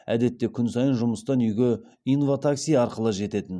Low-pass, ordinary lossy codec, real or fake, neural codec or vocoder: none; none; real; none